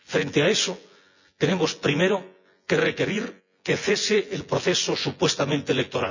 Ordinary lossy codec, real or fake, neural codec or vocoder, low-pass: none; fake; vocoder, 24 kHz, 100 mel bands, Vocos; 7.2 kHz